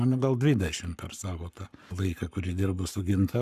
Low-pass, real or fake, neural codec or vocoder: 14.4 kHz; fake; codec, 44.1 kHz, 7.8 kbps, Pupu-Codec